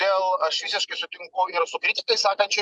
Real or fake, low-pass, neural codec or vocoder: real; 10.8 kHz; none